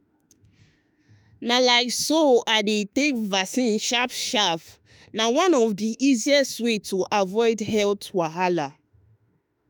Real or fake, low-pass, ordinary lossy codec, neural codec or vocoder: fake; none; none; autoencoder, 48 kHz, 32 numbers a frame, DAC-VAE, trained on Japanese speech